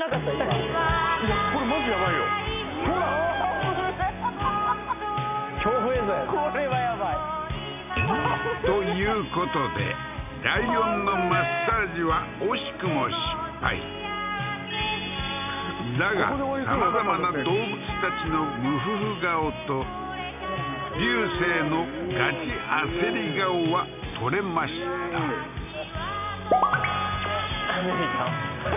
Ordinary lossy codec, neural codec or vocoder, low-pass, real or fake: none; none; 3.6 kHz; real